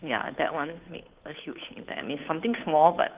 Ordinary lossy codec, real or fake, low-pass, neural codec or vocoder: Opus, 32 kbps; fake; 3.6 kHz; codec, 16 kHz, 2 kbps, FunCodec, trained on Chinese and English, 25 frames a second